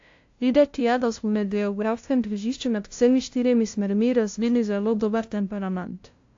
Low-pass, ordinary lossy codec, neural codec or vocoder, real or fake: 7.2 kHz; AAC, 48 kbps; codec, 16 kHz, 0.5 kbps, FunCodec, trained on LibriTTS, 25 frames a second; fake